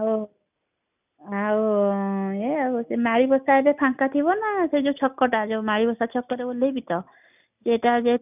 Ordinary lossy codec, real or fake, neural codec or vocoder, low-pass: none; real; none; 3.6 kHz